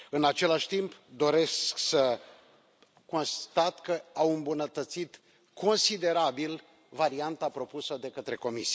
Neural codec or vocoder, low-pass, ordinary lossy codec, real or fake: none; none; none; real